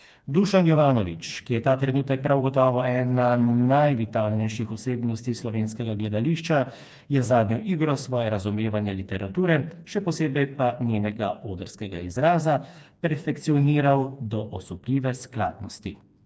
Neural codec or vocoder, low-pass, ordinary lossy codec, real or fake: codec, 16 kHz, 2 kbps, FreqCodec, smaller model; none; none; fake